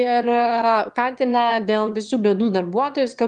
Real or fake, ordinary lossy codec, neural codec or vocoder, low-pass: fake; Opus, 24 kbps; autoencoder, 22.05 kHz, a latent of 192 numbers a frame, VITS, trained on one speaker; 9.9 kHz